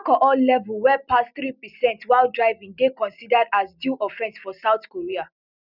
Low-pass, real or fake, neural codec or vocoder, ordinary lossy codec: 5.4 kHz; real; none; none